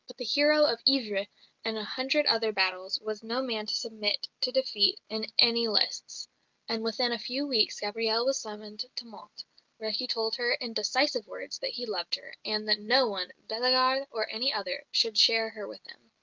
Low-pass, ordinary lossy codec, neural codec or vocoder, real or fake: 7.2 kHz; Opus, 32 kbps; none; real